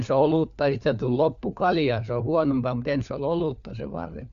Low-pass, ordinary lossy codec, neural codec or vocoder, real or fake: 7.2 kHz; Opus, 64 kbps; codec, 16 kHz, 4 kbps, FunCodec, trained on LibriTTS, 50 frames a second; fake